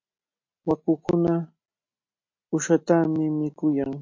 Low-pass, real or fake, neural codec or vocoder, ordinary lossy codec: 7.2 kHz; real; none; MP3, 48 kbps